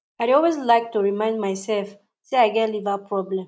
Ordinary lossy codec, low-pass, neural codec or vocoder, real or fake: none; none; none; real